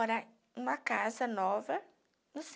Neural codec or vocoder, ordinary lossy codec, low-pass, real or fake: none; none; none; real